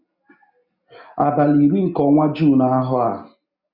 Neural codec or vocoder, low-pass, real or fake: none; 5.4 kHz; real